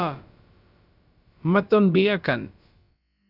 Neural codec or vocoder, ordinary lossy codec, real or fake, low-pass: codec, 16 kHz, about 1 kbps, DyCAST, with the encoder's durations; Opus, 64 kbps; fake; 5.4 kHz